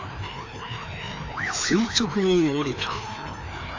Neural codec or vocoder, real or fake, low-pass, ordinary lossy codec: codec, 16 kHz, 2 kbps, FreqCodec, larger model; fake; 7.2 kHz; AAC, 48 kbps